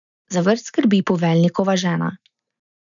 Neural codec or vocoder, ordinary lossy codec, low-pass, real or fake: none; none; 7.2 kHz; real